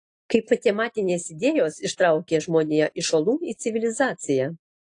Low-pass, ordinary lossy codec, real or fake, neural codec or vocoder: 10.8 kHz; AAC, 48 kbps; real; none